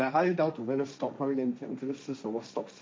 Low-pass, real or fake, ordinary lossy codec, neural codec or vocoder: none; fake; none; codec, 16 kHz, 1.1 kbps, Voila-Tokenizer